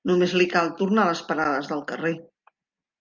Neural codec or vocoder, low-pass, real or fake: none; 7.2 kHz; real